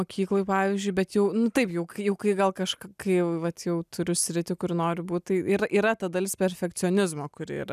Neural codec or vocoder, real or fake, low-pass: none; real; 14.4 kHz